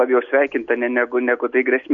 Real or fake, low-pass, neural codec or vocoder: real; 7.2 kHz; none